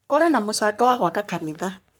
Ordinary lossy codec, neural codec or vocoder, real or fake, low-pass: none; codec, 44.1 kHz, 3.4 kbps, Pupu-Codec; fake; none